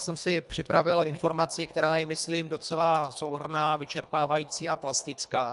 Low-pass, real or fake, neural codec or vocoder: 10.8 kHz; fake; codec, 24 kHz, 1.5 kbps, HILCodec